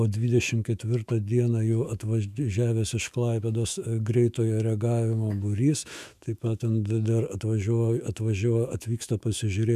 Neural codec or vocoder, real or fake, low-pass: autoencoder, 48 kHz, 128 numbers a frame, DAC-VAE, trained on Japanese speech; fake; 14.4 kHz